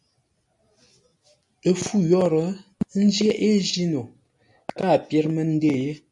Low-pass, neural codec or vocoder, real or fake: 10.8 kHz; none; real